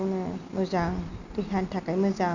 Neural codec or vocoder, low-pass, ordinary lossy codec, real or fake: none; 7.2 kHz; none; real